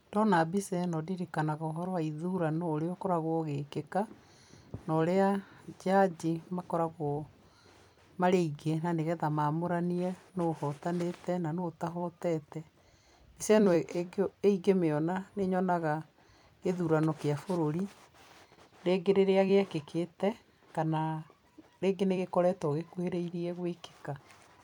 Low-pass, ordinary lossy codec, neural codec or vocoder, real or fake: 19.8 kHz; none; vocoder, 44.1 kHz, 128 mel bands every 256 samples, BigVGAN v2; fake